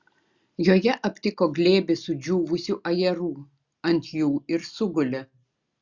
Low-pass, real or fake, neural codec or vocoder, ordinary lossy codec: 7.2 kHz; real; none; Opus, 64 kbps